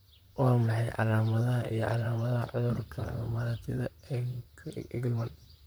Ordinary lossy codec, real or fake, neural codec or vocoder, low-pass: none; fake; vocoder, 44.1 kHz, 128 mel bands, Pupu-Vocoder; none